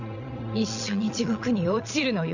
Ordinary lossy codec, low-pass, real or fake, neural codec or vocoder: none; 7.2 kHz; fake; vocoder, 22.05 kHz, 80 mel bands, Vocos